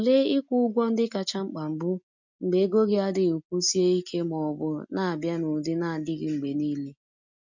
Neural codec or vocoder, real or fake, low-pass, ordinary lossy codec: none; real; 7.2 kHz; MP3, 64 kbps